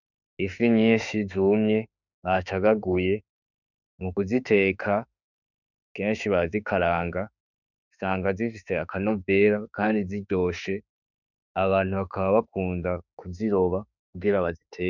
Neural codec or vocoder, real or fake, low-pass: autoencoder, 48 kHz, 32 numbers a frame, DAC-VAE, trained on Japanese speech; fake; 7.2 kHz